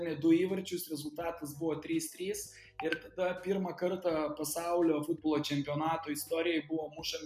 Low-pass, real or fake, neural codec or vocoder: 14.4 kHz; real; none